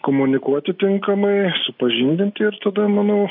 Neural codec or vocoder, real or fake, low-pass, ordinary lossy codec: none; real; 5.4 kHz; AAC, 48 kbps